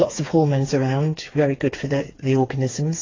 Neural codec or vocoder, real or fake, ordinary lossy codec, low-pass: codec, 16 kHz, 4 kbps, FreqCodec, smaller model; fake; AAC, 32 kbps; 7.2 kHz